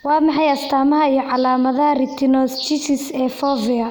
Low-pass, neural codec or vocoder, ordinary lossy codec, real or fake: none; none; none; real